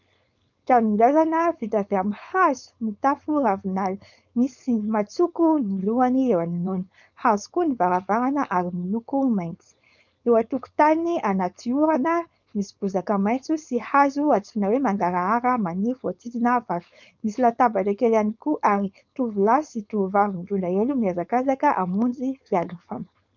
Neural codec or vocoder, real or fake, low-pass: codec, 16 kHz, 4.8 kbps, FACodec; fake; 7.2 kHz